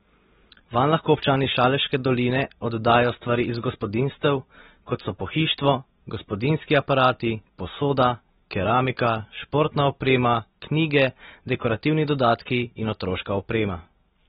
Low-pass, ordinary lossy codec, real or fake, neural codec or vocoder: 19.8 kHz; AAC, 16 kbps; real; none